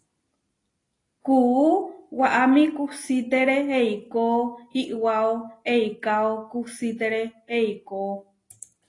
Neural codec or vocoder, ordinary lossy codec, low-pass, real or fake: none; AAC, 32 kbps; 10.8 kHz; real